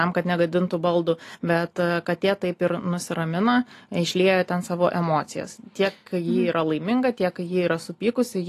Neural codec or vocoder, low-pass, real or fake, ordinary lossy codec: none; 14.4 kHz; real; AAC, 48 kbps